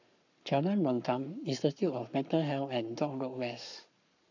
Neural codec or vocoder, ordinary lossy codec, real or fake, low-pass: codec, 44.1 kHz, 7.8 kbps, Pupu-Codec; none; fake; 7.2 kHz